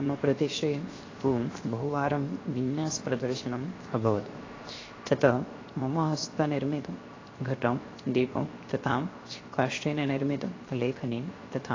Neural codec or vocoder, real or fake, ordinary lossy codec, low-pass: codec, 16 kHz, 0.7 kbps, FocalCodec; fake; AAC, 32 kbps; 7.2 kHz